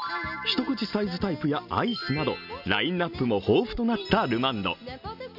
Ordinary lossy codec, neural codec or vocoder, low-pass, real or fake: none; none; 5.4 kHz; real